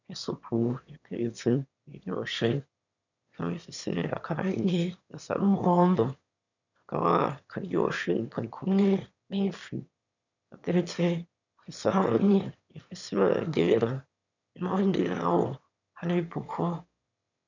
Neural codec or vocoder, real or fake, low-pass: autoencoder, 22.05 kHz, a latent of 192 numbers a frame, VITS, trained on one speaker; fake; 7.2 kHz